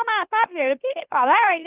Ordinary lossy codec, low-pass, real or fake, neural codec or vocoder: Opus, 24 kbps; 3.6 kHz; fake; autoencoder, 44.1 kHz, a latent of 192 numbers a frame, MeloTTS